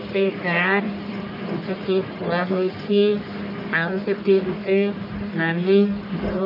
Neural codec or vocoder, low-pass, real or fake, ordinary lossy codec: codec, 44.1 kHz, 1.7 kbps, Pupu-Codec; 5.4 kHz; fake; none